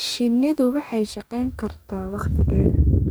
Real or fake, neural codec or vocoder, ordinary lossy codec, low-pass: fake; codec, 44.1 kHz, 2.6 kbps, DAC; none; none